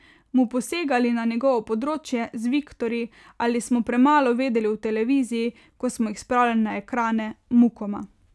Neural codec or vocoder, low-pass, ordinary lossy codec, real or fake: none; none; none; real